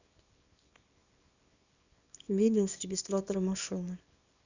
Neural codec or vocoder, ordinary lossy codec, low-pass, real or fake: codec, 24 kHz, 0.9 kbps, WavTokenizer, small release; none; 7.2 kHz; fake